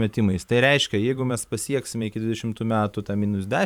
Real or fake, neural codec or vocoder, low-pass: fake; vocoder, 44.1 kHz, 128 mel bands every 512 samples, BigVGAN v2; 19.8 kHz